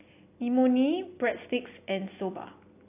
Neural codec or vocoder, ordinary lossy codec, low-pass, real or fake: none; AAC, 24 kbps; 3.6 kHz; real